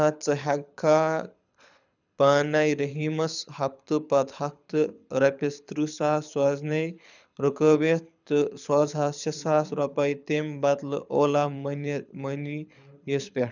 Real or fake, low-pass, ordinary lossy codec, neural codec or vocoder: fake; 7.2 kHz; none; codec, 24 kHz, 6 kbps, HILCodec